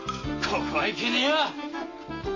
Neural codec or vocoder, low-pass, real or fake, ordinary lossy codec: none; 7.2 kHz; real; MP3, 32 kbps